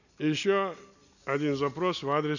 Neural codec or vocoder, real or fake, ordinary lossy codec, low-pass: none; real; none; 7.2 kHz